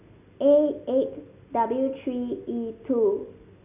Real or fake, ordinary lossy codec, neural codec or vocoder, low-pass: real; none; none; 3.6 kHz